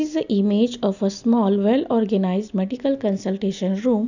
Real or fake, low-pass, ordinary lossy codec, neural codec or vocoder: real; 7.2 kHz; none; none